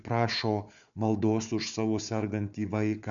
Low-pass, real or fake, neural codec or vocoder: 7.2 kHz; fake; codec, 16 kHz, 4 kbps, FunCodec, trained on Chinese and English, 50 frames a second